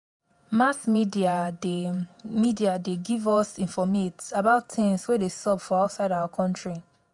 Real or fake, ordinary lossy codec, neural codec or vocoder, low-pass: fake; none; vocoder, 48 kHz, 128 mel bands, Vocos; 10.8 kHz